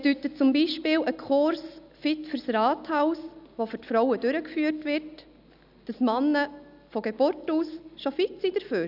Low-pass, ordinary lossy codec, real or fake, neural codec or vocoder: 5.4 kHz; none; real; none